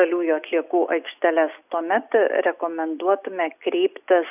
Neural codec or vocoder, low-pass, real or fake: none; 3.6 kHz; real